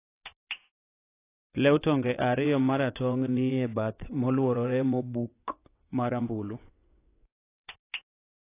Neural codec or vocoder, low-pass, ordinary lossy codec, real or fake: vocoder, 22.05 kHz, 80 mel bands, WaveNeXt; 3.6 kHz; AAC, 24 kbps; fake